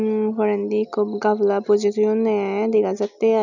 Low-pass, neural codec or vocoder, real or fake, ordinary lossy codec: 7.2 kHz; none; real; none